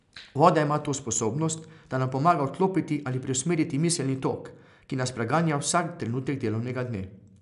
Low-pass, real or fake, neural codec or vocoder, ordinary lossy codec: 10.8 kHz; real; none; none